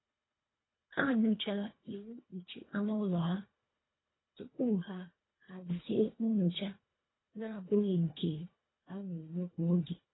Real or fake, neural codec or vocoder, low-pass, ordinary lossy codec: fake; codec, 24 kHz, 1.5 kbps, HILCodec; 7.2 kHz; AAC, 16 kbps